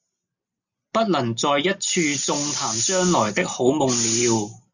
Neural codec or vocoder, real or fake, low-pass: none; real; 7.2 kHz